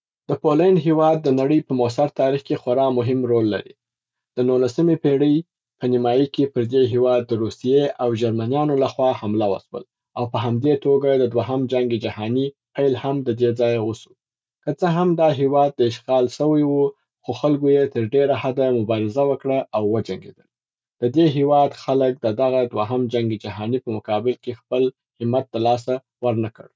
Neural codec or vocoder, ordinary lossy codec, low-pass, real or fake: none; none; none; real